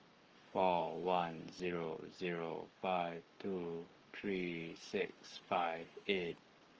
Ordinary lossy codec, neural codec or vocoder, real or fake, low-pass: Opus, 24 kbps; codec, 44.1 kHz, 7.8 kbps, Pupu-Codec; fake; 7.2 kHz